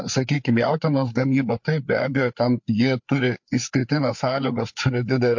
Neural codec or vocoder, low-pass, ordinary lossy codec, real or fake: codec, 16 kHz, 4 kbps, FreqCodec, larger model; 7.2 kHz; MP3, 48 kbps; fake